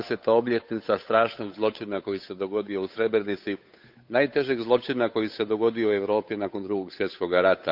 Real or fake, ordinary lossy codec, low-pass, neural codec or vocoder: fake; none; 5.4 kHz; codec, 16 kHz, 8 kbps, FunCodec, trained on Chinese and English, 25 frames a second